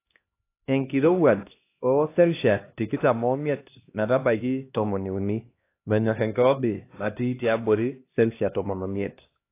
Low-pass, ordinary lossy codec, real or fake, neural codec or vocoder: 3.6 kHz; AAC, 24 kbps; fake; codec, 16 kHz, 1 kbps, X-Codec, HuBERT features, trained on LibriSpeech